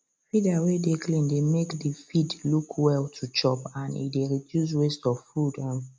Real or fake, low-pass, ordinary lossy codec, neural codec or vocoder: real; none; none; none